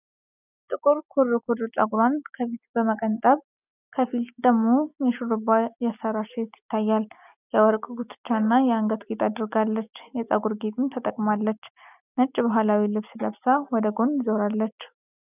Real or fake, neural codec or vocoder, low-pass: real; none; 3.6 kHz